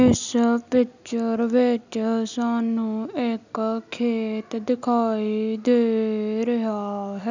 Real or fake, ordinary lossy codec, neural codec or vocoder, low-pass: real; none; none; 7.2 kHz